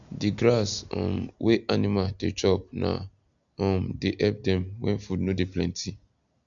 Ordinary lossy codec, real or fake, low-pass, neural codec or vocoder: none; real; 7.2 kHz; none